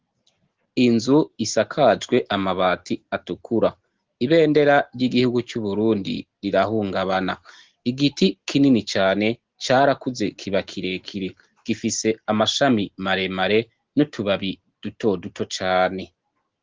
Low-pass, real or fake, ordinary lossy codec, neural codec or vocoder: 7.2 kHz; real; Opus, 16 kbps; none